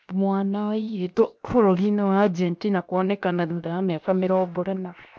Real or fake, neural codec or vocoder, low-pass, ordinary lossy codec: fake; codec, 16 kHz, 0.7 kbps, FocalCodec; none; none